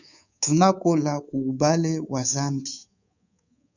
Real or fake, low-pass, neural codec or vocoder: fake; 7.2 kHz; codec, 24 kHz, 3.1 kbps, DualCodec